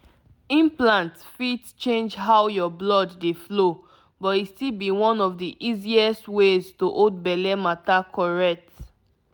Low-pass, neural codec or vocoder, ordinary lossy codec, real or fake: none; none; none; real